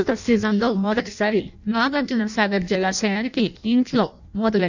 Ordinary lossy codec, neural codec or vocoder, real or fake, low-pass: none; codec, 16 kHz in and 24 kHz out, 0.6 kbps, FireRedTTS-2 codec; fake; 7.2 kHz